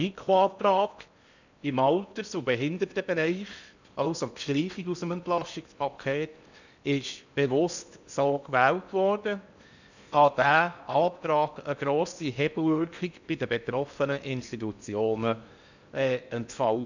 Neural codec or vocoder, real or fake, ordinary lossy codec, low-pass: codec, 16 kHz in and 24 kHz out, 0.8 kbps, FocalCodec, streaming, 65536 codes; fake; none; 7.2 kHz